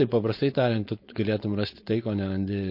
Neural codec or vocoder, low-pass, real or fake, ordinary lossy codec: codec, 16 kHz, 4.8 kbps, FACodec; 5.4 kHz; fake; MP3, 32 kbps